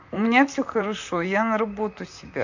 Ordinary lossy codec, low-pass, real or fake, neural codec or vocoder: none; 7.2 kHz; fake; vocoder, 44.1 kHz, 128 mel bands, Pupu-Vocoder